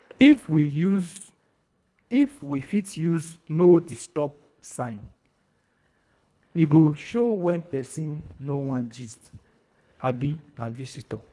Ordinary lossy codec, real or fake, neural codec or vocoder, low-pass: none; fake; codec, 24 kHz, 1.5 kbps, HILCodec; none